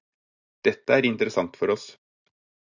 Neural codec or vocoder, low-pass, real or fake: none; 7.2 kHz; real